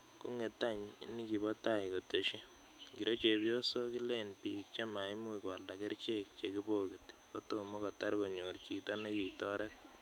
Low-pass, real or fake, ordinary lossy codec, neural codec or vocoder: 19.8 kHz; fake; none; autoencoder, 48 kHz, 128 numbers a frame, DAC-VAE, trained on Japanese speech